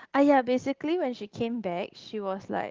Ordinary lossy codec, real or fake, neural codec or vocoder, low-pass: Opus, 16 kbps; real; none; 7.2 kHz